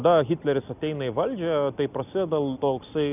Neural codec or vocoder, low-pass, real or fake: none; 3.6 kHz; real